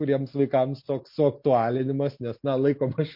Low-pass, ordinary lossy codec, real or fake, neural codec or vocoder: 5.4 kHz; MP3, 32 kbps; real; none